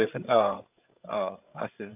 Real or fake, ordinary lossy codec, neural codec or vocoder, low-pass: fake; AAC, 32 kbps; codec, 16 kHz, 8 kbps, FreqCodec, larger model; 3.6 kHz